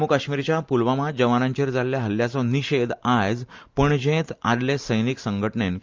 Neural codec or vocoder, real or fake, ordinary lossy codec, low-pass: none; real; Opus, 24 kbps; 7.2 kHz